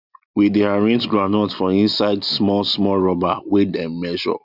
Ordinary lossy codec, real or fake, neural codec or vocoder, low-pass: none; real; none; 5.4 kHz